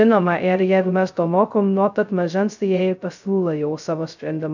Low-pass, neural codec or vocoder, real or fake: 7.2 kHz; codec, 16 kHz, 0.2 kbps, FocalCodec; fake